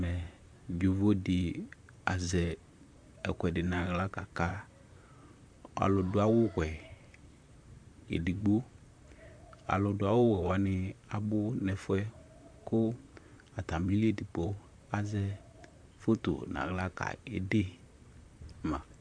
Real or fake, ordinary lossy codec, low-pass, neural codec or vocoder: fake; AAC, 64 kbps; 9.9 kHz; vocoder, 44.1 kHz, 128 mel bands, Pupu-Vocoder